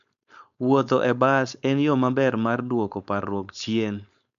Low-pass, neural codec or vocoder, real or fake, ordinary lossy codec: 7.2 kHz; codec, 16 kHz, 4.8 kbps, FACodec; fake; none